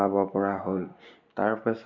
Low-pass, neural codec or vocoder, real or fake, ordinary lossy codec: 7.2 kHz; none; real; MP3, 64 kbps